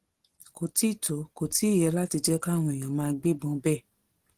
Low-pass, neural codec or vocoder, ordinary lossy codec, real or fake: 14.4 kHz; none; Opus, 16 kbps; real